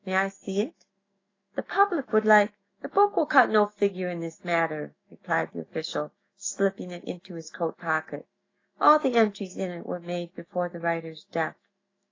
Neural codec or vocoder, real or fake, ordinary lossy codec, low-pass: autoencoder, 48 kHz, 128 numbers a frame, DAC-VAE, trained on Japanese speech; fake; AAC, 32 kbps; 7.2 kHz